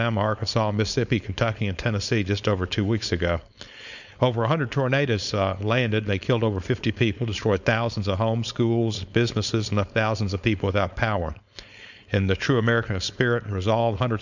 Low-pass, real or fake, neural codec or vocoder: 7.2 kHz; fake; codec, 16 kHz, 4.8 kbps, FACodec